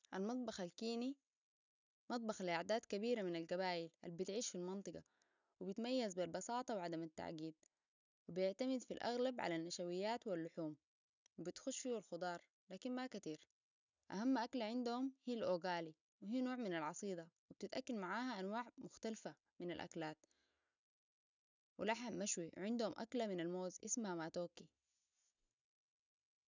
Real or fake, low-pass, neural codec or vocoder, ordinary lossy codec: real; 7.2 kHz; none; none